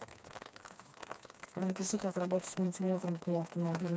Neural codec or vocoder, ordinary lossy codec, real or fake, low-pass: codec, 16 kHz, 2 kbps, FreqCodec, smaller model; none; fake; none